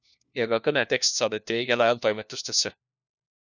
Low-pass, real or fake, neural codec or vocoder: 7.2 kHz; fake; codec, 16 kHz, 1 kbps, FunCodec, trained on LibriTTS, 50 frames a second